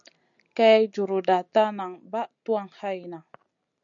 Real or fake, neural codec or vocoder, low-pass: real; none; 7.2 kHz